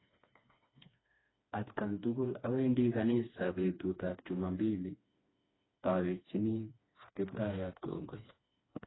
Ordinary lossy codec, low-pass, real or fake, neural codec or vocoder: AAC, 16 kbps; 7.2 kHz; fake; codec, 16 kHz, 2 kbps, FreqCodec, smaller model